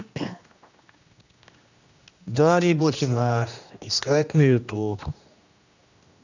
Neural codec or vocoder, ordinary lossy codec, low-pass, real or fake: codec, 16 kHz, 1 kbps, X-Codec, HuBERT features, trained on general audio; none; 7.2 kHz; fake